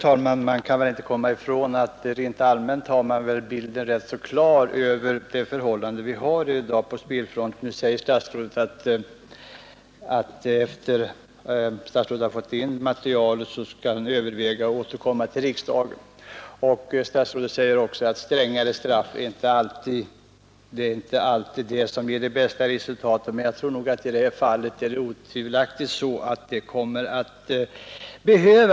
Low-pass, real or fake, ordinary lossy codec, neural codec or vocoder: none; real; none; none